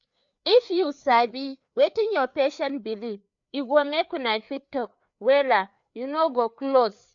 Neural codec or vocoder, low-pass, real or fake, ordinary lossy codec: codec, 16 kHz, 4 kbps, FreqCodec, larger model; 7.2 kHz; fake; none